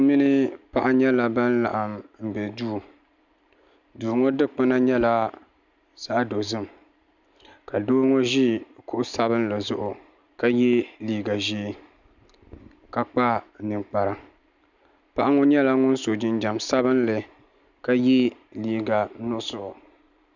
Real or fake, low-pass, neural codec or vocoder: real; 7.2 kHz; none